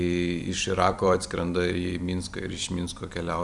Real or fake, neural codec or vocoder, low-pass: fake; vocoder, 44.1 kHz, 128 mel bands every 256 samples, BigVGAN v2; 10.8 kHz